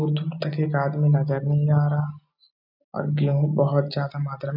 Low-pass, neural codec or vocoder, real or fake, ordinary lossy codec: 5.4 kHz; none; real; none